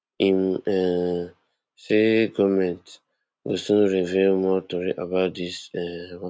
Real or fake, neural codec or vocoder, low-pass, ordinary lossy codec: real; none; none; none